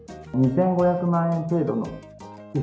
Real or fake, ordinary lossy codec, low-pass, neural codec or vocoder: real; none; none; none